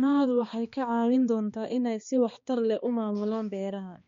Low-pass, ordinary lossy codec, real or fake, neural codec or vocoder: 7.2 kHz; MP3, 48 kbps; fake; codec, 16 kHz, 2 kbps, X-Codec, HuBERT features, trained on balanced general audio